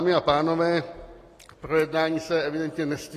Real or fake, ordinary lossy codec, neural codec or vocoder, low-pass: real; AAC, 48 kbps; none; 14.4 kHz